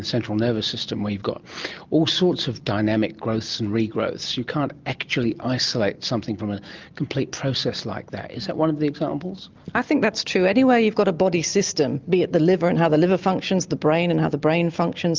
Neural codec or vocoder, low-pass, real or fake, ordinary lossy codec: none; 7.2 kHz; real; Opus, 32 kbps